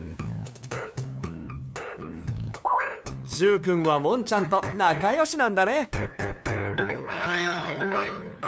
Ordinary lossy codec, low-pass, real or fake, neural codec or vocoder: none; none; fake; codec, 16 kHz, 2 kbps, FunCodec, trained on LibriTTS, 25 frames a second